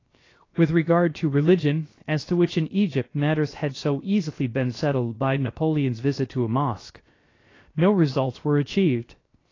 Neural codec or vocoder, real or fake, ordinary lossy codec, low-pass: codec, 16 kHz, 0.7 kbps, FocalCodec; fake; AAC, 32 kbps; 7.2 kHz